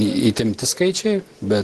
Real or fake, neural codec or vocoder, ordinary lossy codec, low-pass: real; none; Opus, 16 kbps; 10.8 kHz